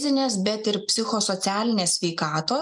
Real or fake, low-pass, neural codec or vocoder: real; 10.8 kHz; none